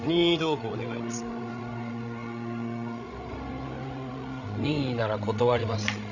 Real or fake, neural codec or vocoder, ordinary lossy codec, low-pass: fake; codec, 16 kHz, 16 kbps, FreqCodec, larger model; none; 7.2 kHz